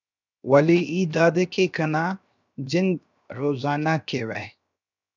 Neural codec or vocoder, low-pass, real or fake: codec, 16 kHz, 0.7 kbps, FocalCodec; 7.2 kHz; fake